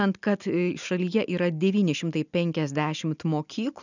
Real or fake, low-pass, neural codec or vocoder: real; 7.2 kHz; none